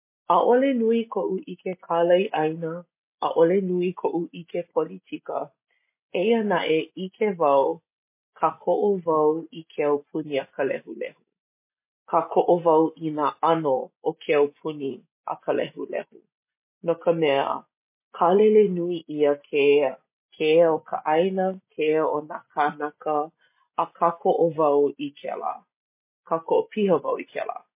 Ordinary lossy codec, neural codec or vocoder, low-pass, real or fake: MP3, 24 kbps; none; 3.6 kHz; real